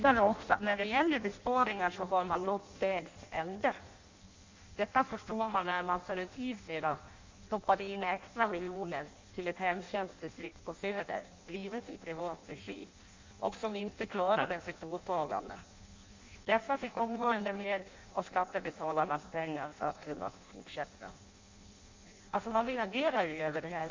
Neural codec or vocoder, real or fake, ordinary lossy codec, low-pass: codec, 16 kHz in and 24 kHz out, 0.6 kbps, FireRedTTS-2 codec; fake; MP3, 64 kbps; 7.2 kHz